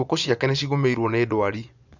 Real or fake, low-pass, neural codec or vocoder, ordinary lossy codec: fake; 7.2 kHz; vocoder, 24 kHz, 100 mel bands, Vocos; none